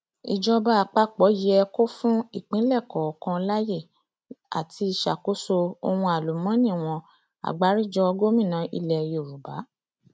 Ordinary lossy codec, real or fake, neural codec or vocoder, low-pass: none; real; none; none